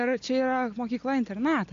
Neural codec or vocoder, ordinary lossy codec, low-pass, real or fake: none; AAC, 64 kbps; 7.2 kHz; real